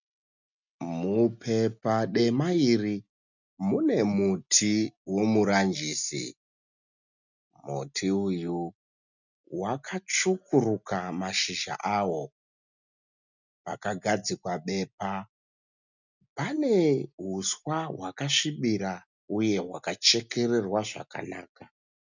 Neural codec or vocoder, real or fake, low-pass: none; real; 7.2 kHz